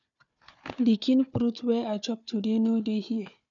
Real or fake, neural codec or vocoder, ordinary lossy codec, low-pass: fake; codec, 16 kHz, 4 kbps, FunCodec, trained on Chinese and English, 50 frames a second; none; 7.2 kHz